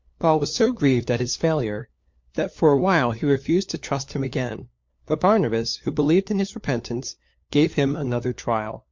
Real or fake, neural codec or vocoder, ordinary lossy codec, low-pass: fake; codec, 16 kHz, 4 kbps, FunCodec, trained on LibriTTS, 50 frames a second; MP3, 48 kbps; 7.2 kHz